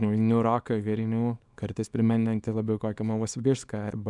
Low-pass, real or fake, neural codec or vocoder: 10.8 kHz; fake; codec, 24 kHz, 0.9 kbps, WavTokenizer, small release